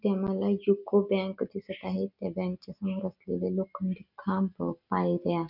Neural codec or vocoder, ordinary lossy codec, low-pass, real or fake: none; none; 5.4 kHz; real